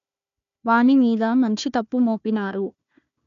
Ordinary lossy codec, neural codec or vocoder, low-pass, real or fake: none; codec, 16 kHz, 1 kbps, FunCodec, trained on Chinese and English, 50 frames a second; 7.2 kHz; fake